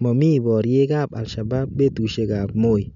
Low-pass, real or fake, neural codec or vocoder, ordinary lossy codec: 7.2 kHz; real; none; none